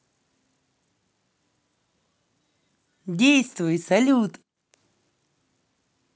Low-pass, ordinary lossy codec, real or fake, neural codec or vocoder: none; none; real; none